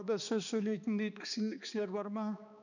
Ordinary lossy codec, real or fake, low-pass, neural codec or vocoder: none; fake; 7.2 kHz; codec, 16 kHz, 2 kbps, X-Codec, HuBERT features, trained on balanced general audio